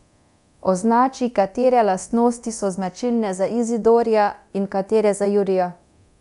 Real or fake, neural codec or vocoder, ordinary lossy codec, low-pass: fake; codec, 24 kHz, 0.9 kbps, DualCodec; none; 10.8 kHz